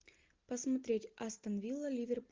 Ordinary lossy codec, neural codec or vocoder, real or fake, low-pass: Opus, 32 kbps; none; real; 7.2 kHz